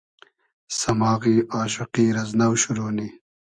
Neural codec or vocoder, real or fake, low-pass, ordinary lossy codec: none; real; 9.9 kHz; Opus, 64 kbps